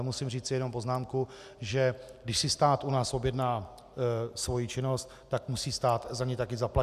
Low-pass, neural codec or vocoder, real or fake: 14.4 kHz; none; real